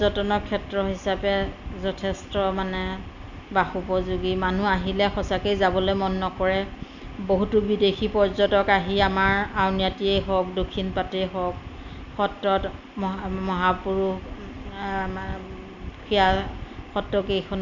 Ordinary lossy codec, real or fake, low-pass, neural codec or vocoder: none; real; 7.2 kHz; none